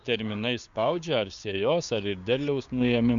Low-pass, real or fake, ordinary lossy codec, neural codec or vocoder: 7.2 kHz; fake; MP3, 64 kbps; codec, 16 kHz, 6 kbps, DAC